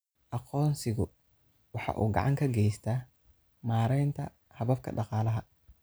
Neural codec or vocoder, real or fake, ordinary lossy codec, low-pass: none; real; none; none